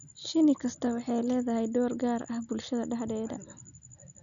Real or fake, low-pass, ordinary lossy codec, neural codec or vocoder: real; 7.2 kHz; none; none